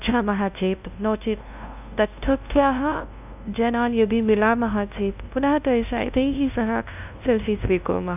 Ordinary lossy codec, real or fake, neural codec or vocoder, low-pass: none; fake; codec, 16 kHz, 0.5 kbps, FunCodec, trained on LibriTTS, 25 frames a second; 3.6 kHz